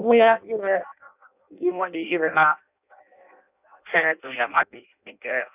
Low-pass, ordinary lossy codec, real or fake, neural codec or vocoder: 3.6 kHz; none; fake; codec, 16 kHz in and 24 kHz out, 0.6 kbps, FireRedTTS-2 codec